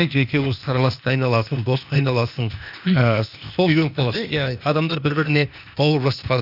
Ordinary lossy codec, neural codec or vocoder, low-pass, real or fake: none; codec, 16 kHz, 0.8 kbps, ZipCodec; 5.4 kHz; fake